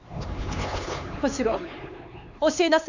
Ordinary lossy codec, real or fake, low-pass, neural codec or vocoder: none; fake; 7.2 kHz; codec, 16 kHz, 4 kbps, X-Codec, HuBERT features, trained on LibriSpeech